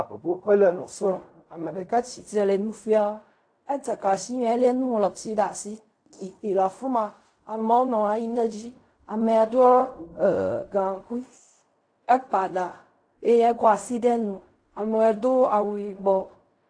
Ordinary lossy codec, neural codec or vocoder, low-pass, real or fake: AAC, 48 kbps; codec, 16 kHz in and 24 kHz out, 0.4 kbps, LongCat-Audio-Codec, fine tuned four codebook decoder; 9.9 kHz; fake